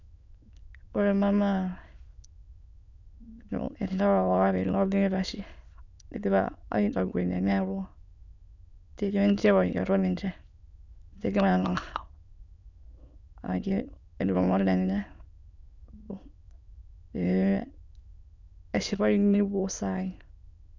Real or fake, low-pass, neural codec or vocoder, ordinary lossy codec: fake; 7.2 kHz; autoencoder, 22.05 kHz, a latent of 192 numbers a frame, VITS, trained on many speakers; none